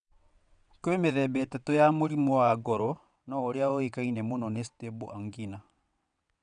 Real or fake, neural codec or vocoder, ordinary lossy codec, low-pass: fake; vocoder, 22.05 kHz, 80 mel bands, WaveNeXt; none; 9.9 kHz